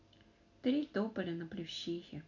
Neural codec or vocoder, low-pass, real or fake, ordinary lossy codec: none; 7.2 kHz; real; none